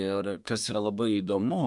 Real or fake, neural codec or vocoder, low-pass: fake; codec, 24 kHz, 1 kbps, SNAC; 10.8 kHz